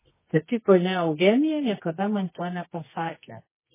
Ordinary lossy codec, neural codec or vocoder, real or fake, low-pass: MP3, 16 kbps; codec, 24 kHz, 0.9 kbps, WavTokenizer, medium music audio release; fake; 3.6 kHz